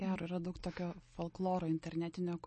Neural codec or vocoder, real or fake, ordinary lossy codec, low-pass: none; real; MP3, 32 kbps; 10.8 kHz